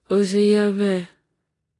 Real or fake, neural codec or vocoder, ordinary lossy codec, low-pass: fake; codec, 16 kHz in and 24 kHz out, 0.9 kbps, LongCat-Audio-Codec, four codebook decoder; AAC, 32 kbps; 10.8 kHz